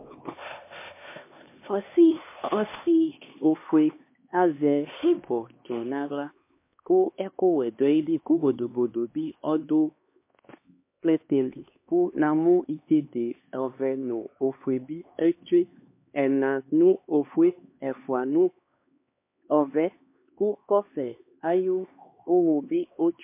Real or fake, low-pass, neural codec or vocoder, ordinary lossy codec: fake; 3.6 kHz; codec, 16 kHz, 2 kbps, X-Codec, HuBERT features, trained on LibriSpeech; MP3, 24 kbps